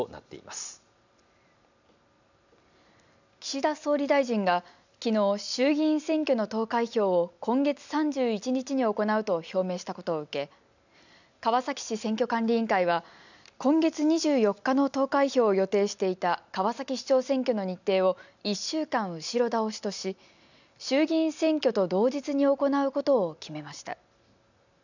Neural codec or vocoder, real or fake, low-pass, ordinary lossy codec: none; real; 7.2 kHz; none